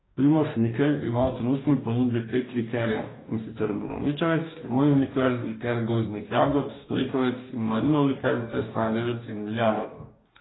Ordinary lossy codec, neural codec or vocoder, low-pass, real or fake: AAC, 16 kbps; codec, 44.1 kHz, 2.6 kbps, DAC; 7.2 kHz; fake